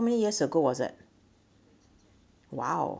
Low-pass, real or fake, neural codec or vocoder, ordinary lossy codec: none; real; none; none